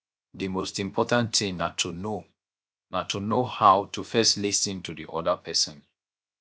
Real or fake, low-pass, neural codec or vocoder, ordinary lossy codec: fake; none; codec, 16 kHz, 0.7 kbps, FocalCodec; none